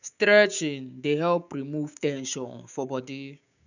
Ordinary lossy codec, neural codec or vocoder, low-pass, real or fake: none; codec, 16 kHz, 6 kbps, DAC; 7.2 kHz; fake